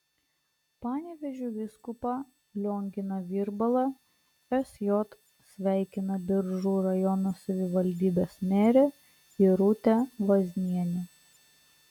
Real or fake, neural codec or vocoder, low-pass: real; none; 19.8 kHz